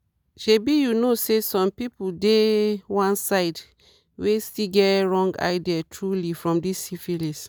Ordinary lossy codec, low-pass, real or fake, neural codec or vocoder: none; none; real; none